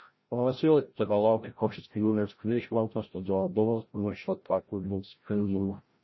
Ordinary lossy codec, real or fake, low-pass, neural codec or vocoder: MP3, 24 kbps; fake; 7.2 kHz; codec, 16 kHz, 0.5 kbps, FreqCodec, larger model